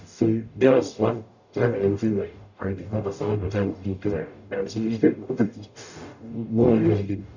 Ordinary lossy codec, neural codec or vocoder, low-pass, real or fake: none; codec, 44.1 kHz, 0.9 kbps, DAC; 7.2 kHz; fake